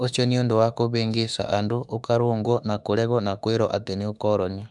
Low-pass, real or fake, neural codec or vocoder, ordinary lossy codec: 10.8 kHz; fake; autoencoder, 48 kHz, 32 numbers a frame, DAC-VAE, trained on Japanese speech; none